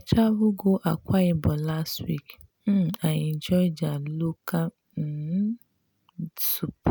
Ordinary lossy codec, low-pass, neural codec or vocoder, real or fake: none; none; none; real